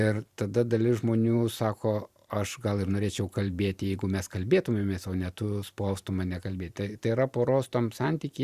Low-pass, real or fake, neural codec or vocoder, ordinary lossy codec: 14.4 kHz; fake; vocoder, 44.1 kHz, 128 mel bands every 512 samples, BigVGAN v2; Opus, 64 kbps